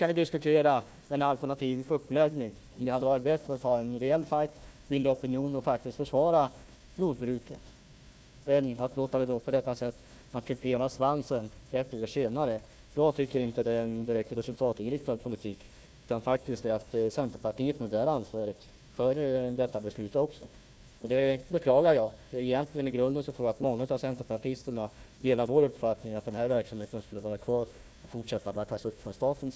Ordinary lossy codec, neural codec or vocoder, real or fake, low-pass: none; codec, 16 kHz, 1 kbps, FunCodec, trained on Chinese and English, 50 frames a second; fake; none